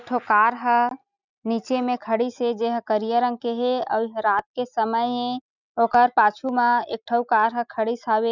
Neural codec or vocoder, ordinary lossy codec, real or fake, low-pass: none; none; real; 7.2 kHz